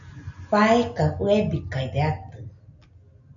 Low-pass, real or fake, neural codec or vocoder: 7.2 kHz; real; none